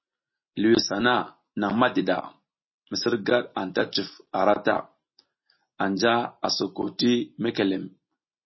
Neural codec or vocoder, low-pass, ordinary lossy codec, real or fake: none; 7.2 kHz; MP3, 24 kbps; real